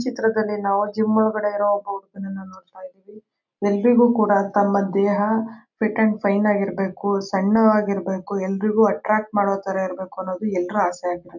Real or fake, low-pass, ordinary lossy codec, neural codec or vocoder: real; 7.2 kHz; none; none